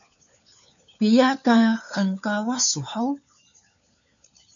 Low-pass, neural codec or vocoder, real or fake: 7.2 kHz; codec, 16 kHz, 4 kbps, FunCodec, trained on LibriTTS, 50 frames a second; fake